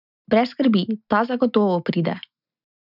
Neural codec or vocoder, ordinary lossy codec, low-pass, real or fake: none; none; 5.4 kHz; real